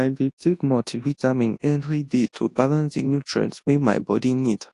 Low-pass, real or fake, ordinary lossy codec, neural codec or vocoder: 10.8 kHz; fake; AAC, 48 kbps; codec, 24 kHz, 0.9 kbps, WavTokenizer, large speech release